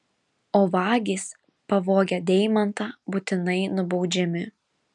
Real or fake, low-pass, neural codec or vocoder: real; 10.8 kHz; none